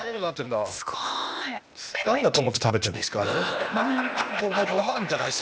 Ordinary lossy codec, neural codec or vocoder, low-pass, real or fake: none; codec, 16 kHz, 0.8 kbps, ZipCodec; none; fake